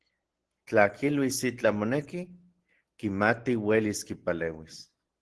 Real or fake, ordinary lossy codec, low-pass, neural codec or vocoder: real; Opus, 16 kbps; 10.8 kHz; none